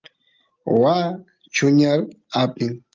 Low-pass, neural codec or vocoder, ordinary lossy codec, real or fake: 7.2 kHz; none; Opus, 24 kbps; real